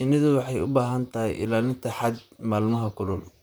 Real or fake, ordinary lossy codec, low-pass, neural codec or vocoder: fake; none; none; vocoder, 44.1 kHz, 128 mel bands, Pupu-Vocoder